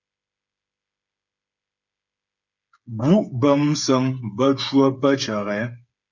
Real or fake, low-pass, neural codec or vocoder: fake; 7.2 kHz; codec, 16 kHz, 8 kbps, FreqCodec, smaller model